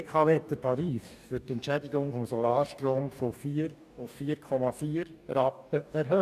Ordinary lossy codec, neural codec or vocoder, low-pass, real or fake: none; codec, 44.1 kHz, 2.6 kbps, DAC; 14.4 kHz; fake